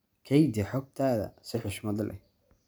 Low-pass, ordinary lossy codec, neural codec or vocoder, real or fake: none; none; none; real